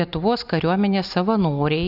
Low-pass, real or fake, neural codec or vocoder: 5.4 kHz; real; none